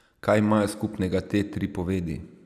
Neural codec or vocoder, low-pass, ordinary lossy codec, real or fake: none; 14.4 kHz; none; real